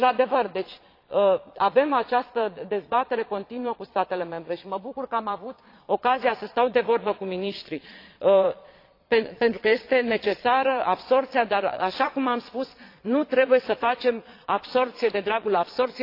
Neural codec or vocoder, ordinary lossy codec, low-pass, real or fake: vocoder, 22.05 kHz, 80 mel bands, Vocos; AAC, 32 kbps; 5.4 kHz; fake